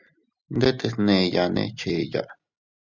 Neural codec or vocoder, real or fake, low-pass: none; real; 7.2 kHz